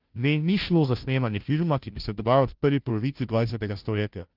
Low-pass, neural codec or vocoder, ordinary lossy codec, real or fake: 5.4 kHz; codec, 16 kHz, 0.5 kbps, FunCodec, trained on Chinese and English, 25 frames a second; Opus, 32 kbps; fake